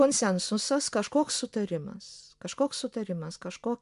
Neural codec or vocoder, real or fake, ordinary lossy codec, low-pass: none; real; MP3, 64 kbps; 10.8 kHz